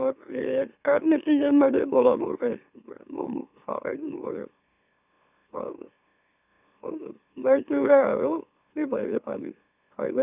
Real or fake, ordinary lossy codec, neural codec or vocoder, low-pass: fake; none; autoencoder, 44.1 kHz, a latent of 192 numbers a frame, MeloTTS; 3.6 kHz